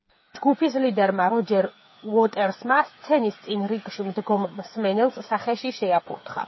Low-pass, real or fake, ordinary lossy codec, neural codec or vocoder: 7.2 kHz; fake; MP3, 24 kbps; codec, 16 kHz, 8 kbps, FreqCodec, smaller model